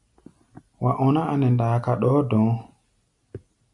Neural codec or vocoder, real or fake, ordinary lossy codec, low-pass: none; real; MP3, 96 kbps; 10.8 kHz